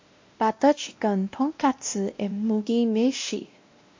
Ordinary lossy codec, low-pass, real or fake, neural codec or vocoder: MP3, 48 kbps; 7.2 kHz; fake; codec, 16 kHz, 1 kbps, X-Codec, WavLM features, trained on Multilingual LibriSpeech